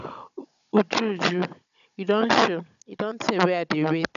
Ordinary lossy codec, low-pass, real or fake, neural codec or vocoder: none; 7.2 kHz; fake; codec, 16 kHz, 16 kbps, FunCodec, trained on Chinese and English, 50 frames a second